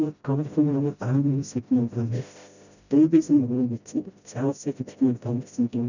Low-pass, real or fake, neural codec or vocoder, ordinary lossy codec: 7.2 kHz; fake; codec, 16 kHz, 0.5 kbps, FreqCodec, smaller model; none